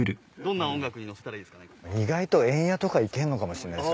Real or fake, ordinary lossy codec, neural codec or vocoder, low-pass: real; none; none; none